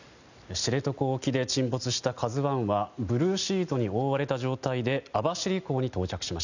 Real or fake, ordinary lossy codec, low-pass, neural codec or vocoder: real; none; 7.2 kHz; none